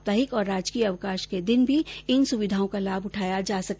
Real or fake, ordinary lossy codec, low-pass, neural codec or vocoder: real; none; none; none